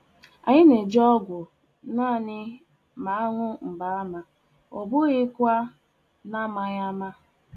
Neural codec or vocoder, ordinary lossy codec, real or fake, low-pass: none; AAC, 64 kbps; real; 14.4 kHz